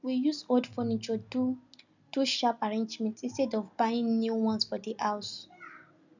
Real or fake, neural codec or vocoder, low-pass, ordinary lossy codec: real; none; 7.2 kHz; none